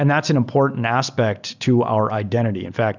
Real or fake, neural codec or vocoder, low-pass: real; none; 7.2 kHz